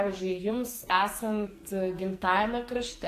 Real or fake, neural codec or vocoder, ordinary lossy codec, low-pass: fake; codec, 44.1 kHz, 2.6 kbps, SNAC; AAC, 64 kbps; 14.4 kHz